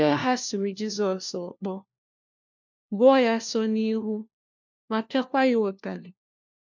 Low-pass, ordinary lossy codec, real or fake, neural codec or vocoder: 7.2 kHz; none; fake; codec, 16 kHz, 1 kbps, FunCodec, trained on LibriTTS, 50 frames a second